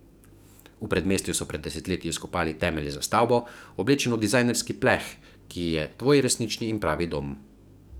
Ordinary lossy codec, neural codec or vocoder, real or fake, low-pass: none; codec, 44.1 kHz, 7.8 kbps, DAC; fake; none